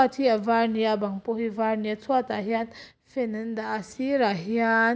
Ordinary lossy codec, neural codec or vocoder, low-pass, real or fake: none; none; none; real